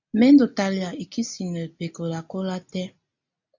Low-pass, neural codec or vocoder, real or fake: 7.2 kHz; none; real